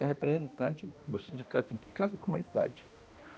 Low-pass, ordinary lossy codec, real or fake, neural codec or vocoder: none; none; fake; codec, 16 kHz, 1 kbps, X-Codec, HuBERT features, trained on balanced general audio